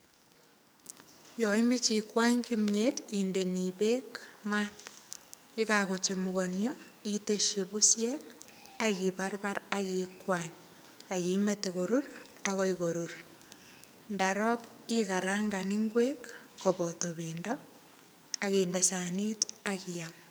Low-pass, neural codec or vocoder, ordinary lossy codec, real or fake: none; codec, 44.1 kHz, 2.6 kbps, SNAC; none; fake